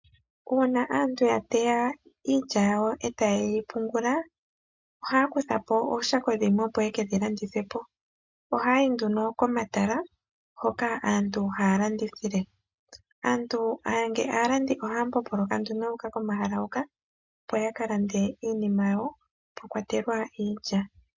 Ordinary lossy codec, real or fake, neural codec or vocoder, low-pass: MP3, 64 kbps; real; none; 7.2 kHz